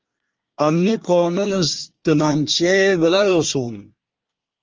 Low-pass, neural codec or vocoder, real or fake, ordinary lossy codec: 7.2 kHz; codec, 24 kHz, 1 kbps, SNAC; fake; Opus, 24 kbps